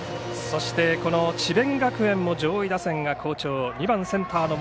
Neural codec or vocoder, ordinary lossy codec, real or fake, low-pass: none; none; real; none